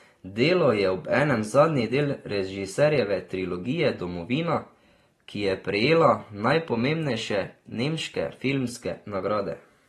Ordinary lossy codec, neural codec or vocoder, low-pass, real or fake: AAC, 32 kbps; none; 19.8 kHz; real